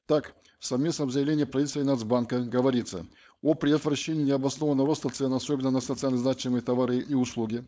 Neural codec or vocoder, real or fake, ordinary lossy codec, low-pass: codec, 16 kHz, 4.8 kbps, FACodec; fake; none; none